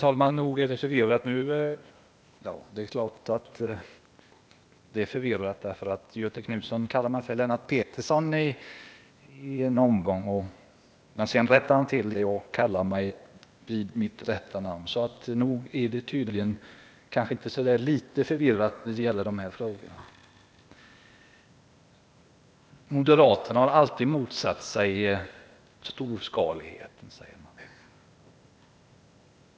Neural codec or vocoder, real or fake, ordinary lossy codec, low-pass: codec, 16 kHz, 0.8 kbps, ZipCodec; fake; none; none